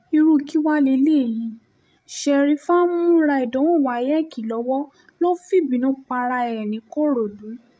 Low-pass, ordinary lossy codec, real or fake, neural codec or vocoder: none; none; fake; codec, 16 kHz, 16 kbps, FreqCodec, larger model